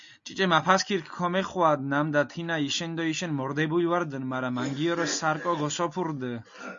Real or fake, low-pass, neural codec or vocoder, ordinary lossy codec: real; 7.2 kHz; none; MP3, 64 kbps